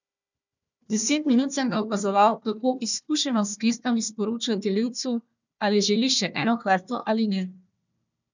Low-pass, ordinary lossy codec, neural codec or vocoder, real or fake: 7.2 kHz; none; codec, 16 kHz, 1 kbps, FunCodec, trained on Chinese and English, 50 frames a second; fake